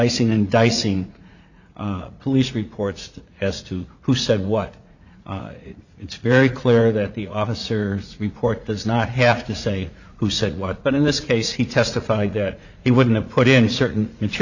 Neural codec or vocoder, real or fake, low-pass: vocoder, 44.1 kHz, 80 mel bands, Vocos; fake; 7.2 kHz